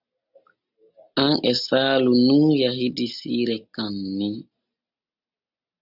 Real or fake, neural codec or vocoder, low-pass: real; none; 5.4 kHz